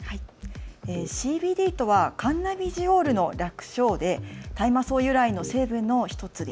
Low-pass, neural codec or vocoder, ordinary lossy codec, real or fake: none; none; none; real